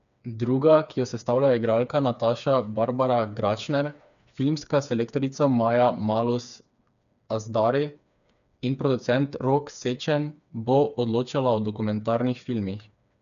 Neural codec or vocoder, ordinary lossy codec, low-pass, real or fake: codec, 16 kHz, 4 kbps, FreqCodec, smaller model; none; 7.2 kHz; fake